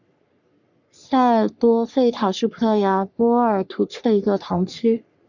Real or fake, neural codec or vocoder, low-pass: fake; codec, 44.1 kHz, 3.4 kbps, Pupu-Codec; 7.2 kHz